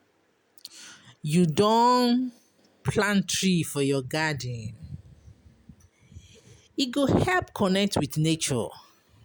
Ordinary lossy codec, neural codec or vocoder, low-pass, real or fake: none; none; none; real